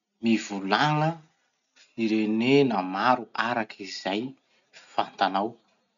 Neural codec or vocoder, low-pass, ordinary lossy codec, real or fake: none; 7.2 kHz; none; real